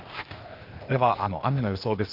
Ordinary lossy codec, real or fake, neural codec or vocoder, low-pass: Opus, 32 kbps; fake; codec, 16 kHz, 0.8 kbps, ZipCodec; 5.4 kHz